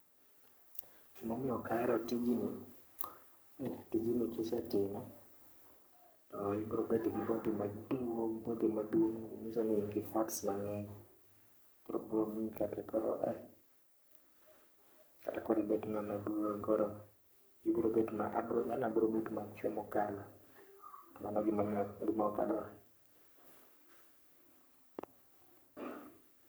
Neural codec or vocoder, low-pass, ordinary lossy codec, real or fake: codec, 44.1 kHz, 3.4 kbps, Pupu-Codec; none; none; fake